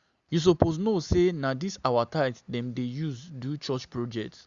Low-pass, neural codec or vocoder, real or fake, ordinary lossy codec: 7.2 kHz; none; real; Opus, 64 kbps